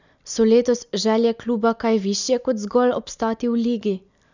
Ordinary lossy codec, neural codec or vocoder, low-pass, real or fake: none; none; 7.2 kHz; real